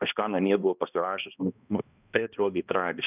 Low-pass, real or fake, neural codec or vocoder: 3.6 kHz; fake; codec, 16 kHz, 1 kbps, X-Codec, HuBERT features, trained on balanced general audio